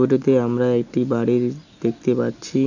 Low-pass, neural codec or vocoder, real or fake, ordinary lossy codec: 7.2 kHz; none; real; none